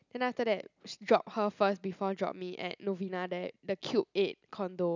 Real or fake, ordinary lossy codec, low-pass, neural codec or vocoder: real; none; 7.2 kHz; none